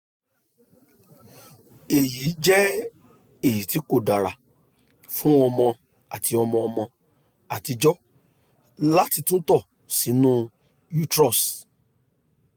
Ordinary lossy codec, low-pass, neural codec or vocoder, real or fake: none; none; none; real